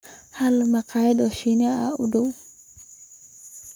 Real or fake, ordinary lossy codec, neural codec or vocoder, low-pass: fake; none; codec, 44.1 kHz, 7.8 kbps, Pupu-Codec; none